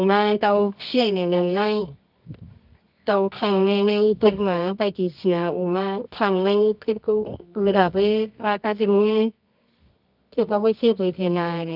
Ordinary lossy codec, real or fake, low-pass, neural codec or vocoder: none; fake; 5.4 kHz; codec, 24 kHz, 0.9 kbps, WavTokenizer, medium music audio release